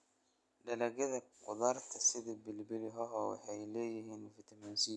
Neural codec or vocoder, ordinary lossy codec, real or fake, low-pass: none; none; real; none